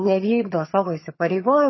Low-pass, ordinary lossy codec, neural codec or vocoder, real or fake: 7.2 kHz; MP3, 24 kbps; vocoder, 22.05 kHz, 80 mel bands, HiFi-GAN; fake